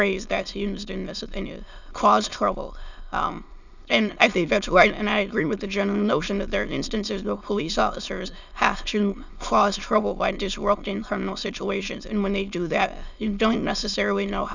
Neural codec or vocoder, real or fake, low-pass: autoencoder, 22.05 kHz, a latent of 192 numbers a frame, VITS, trained on many speakers; fake; 7.2 kHz